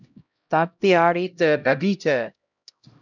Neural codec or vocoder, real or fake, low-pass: codec, 16 kHz, 0.5 kbps, X-Codec, HuBERT features, trained on LibriSpeech; fake; 7.2 kHz